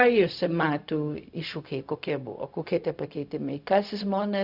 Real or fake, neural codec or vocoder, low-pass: fake; codec, 16 kHz, 0.4 kbps, LongCat-Audio-Codec; 5.4 kHz